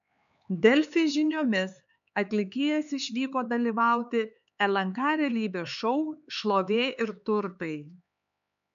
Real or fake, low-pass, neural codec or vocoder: fake; 7.2 kHz; codec, 16 kHz, 4 kbps, X-Codec, HuBERT features, trained on LibriSpeech